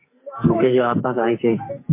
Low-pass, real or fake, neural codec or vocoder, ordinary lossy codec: 3.6 kHz; fake; codec, 44.1 kHz, 2.6 kbps, SNAC; MP3, 24 kbps